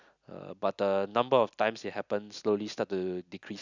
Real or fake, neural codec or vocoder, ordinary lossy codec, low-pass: real; none; none; 7.2 kHz